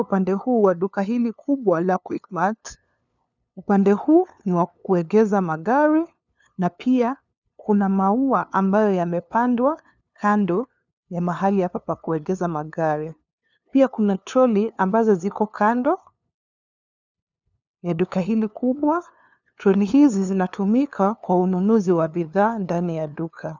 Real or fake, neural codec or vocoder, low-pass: fake; codec, 16 kHz, 2 kbps, FunCodec, trained on LibriTTS, 25 frames a second; 7.2 kHz